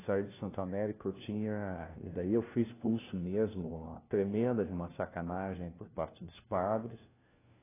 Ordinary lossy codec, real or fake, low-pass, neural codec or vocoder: AAC, 16 kbps; fake; 3.6 kHz; codec, 16 kHz, 1 kbps, FunCodec, trained on LibriTTS, 50 frames a second